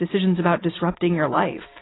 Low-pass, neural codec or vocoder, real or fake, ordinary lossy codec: 7.2 kHz; none; real; AAC, 16 kbps